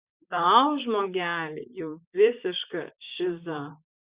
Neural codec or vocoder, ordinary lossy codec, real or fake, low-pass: vocoder, 44.1 kHz, 128 mel bands, Pupu-Vocoder; Opus, 64 kbps; fake; 3.6 kHz